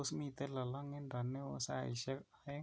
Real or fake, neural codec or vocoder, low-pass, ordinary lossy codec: real; none; none; none